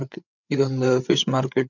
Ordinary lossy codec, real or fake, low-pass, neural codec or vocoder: none; fake; 7.2 kHz; codec, 16 kHz, 8 kbps, FreqCodec, larger model